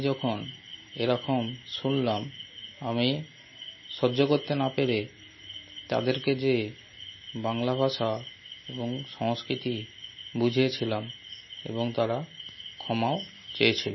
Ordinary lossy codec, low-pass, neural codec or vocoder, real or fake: MP3, 24 kbps; 7.2 kHz; none; real